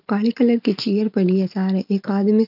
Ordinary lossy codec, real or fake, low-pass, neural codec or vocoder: none; real; 5.4 kHz; none